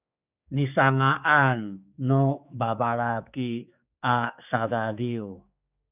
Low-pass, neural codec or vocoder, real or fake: 3.6 kHz; codec, 16 kHz, 4 kbps, X-Codec, HuBERT features, trained on general audio; fake